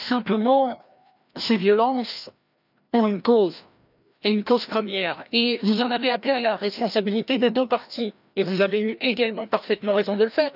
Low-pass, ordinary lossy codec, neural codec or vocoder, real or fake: 5.4 kHz; none; codec, 16 kHz, 1 kbps, FreqCodec, larger model; fake